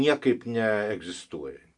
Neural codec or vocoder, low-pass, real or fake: none; 10.8 kHz; real